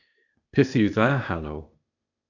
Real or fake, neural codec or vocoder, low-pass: fake; codec, 16 kHz, 0.8 kbps, ZipCodec; 7.2 kHz